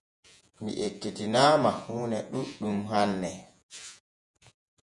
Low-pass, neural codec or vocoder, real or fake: 10.8 kHz; vocoder, 48 kHz, 128 mel bands, Vocos; fake